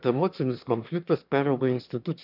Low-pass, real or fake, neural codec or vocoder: 5.4 kHz; fake; autoencoder, 22.05 kHz, a latent of 192 numbers a frame, VITS, trained on one speaker